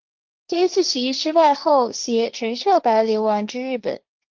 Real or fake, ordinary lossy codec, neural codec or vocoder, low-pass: fake; Opus, 32 kbps; codec, 16 kHz, 1.1 kbps, Voila-Tokenizer; 7.2 kHz